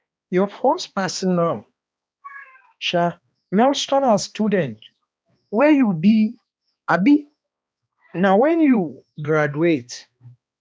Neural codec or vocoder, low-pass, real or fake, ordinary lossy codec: codec, 16 kHz, 2 kbps, X-Codec, HuBERT features, trained on balanced general audio; none; fake; none